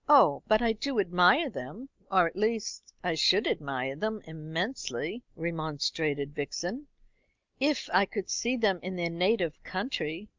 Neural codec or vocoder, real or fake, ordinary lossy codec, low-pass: none; real; Opus, 24 kbps; 7.2 kHz